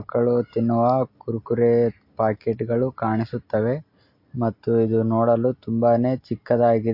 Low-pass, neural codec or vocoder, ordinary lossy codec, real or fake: 5.4 kHz; none; MP3, 32 kbps; real